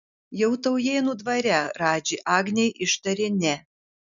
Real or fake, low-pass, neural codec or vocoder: real; 7.2 kHz; none